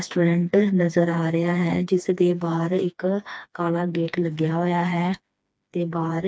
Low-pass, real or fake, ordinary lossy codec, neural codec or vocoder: none; fake; none; codec, 16 kHz, 2 kbps, FreqCodec, smaller model